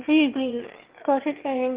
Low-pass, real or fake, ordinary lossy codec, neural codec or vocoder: 3.6 kHz; fake; Opus, 16 kbps; autoencoder, 22.05 kHz, a latent of 192 numbers a frame, VITS, trained on one speaker